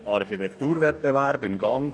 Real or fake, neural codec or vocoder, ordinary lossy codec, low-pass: fake; codec, 44.1 kHz, 2.6 kbps, DAC; none; 9.9 kHz